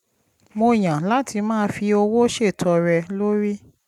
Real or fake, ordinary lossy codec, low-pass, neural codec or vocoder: real; none; 19.8 kHz; none